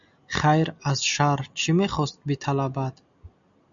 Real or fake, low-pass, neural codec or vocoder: real; 7.2 kHz; none